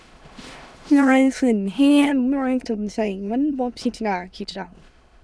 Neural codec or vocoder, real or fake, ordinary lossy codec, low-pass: autoencoder, 22.05 kHz, a latent of 192 numbers a frame, VITS, trained on many speakers; fake; none; none